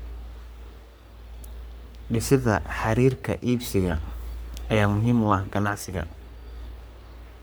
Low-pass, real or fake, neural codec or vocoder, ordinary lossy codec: none; fake; codec, 44.1 kHz, 3.4 kbps, Pupu-Codec; none